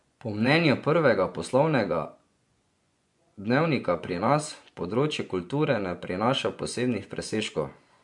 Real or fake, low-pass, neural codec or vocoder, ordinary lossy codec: fake; 10.8 kHz; vocoder, 24 kHz, 100 mel bands, Vocos; MP3, 64 kbps